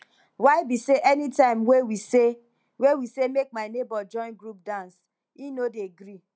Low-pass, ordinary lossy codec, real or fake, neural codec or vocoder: none; none; real; none